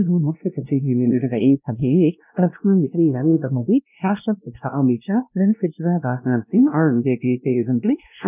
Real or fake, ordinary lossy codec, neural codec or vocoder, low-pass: fake; none; codec, 16 kHz, 1 kbps, X-Codec, WavLM features, trained on Multilingual LibriSpeech; 3.6 kHz